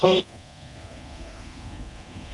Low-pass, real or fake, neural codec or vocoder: 10.8 kHz; fake; codec, 24 kHz, 0.9 kbps, DualCodec